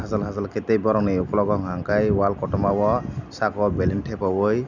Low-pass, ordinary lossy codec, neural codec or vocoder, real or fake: 7.2 kHz; none; none; real